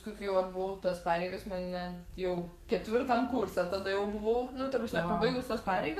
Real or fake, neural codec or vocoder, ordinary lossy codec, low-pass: fake; codec, 44.1 kHz, 2.6 kbps, SNAC; MP3, 96 kbps; 14.4 kHz